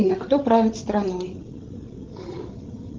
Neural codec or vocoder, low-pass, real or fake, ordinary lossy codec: codec, 16 kHz, 16 kbps, FreqCodec, larger model; 7.2 kHz; fake; Opus, 16 kbps